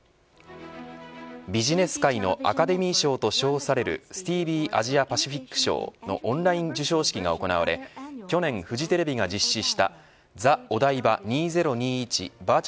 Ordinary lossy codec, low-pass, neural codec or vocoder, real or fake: none; none; none; real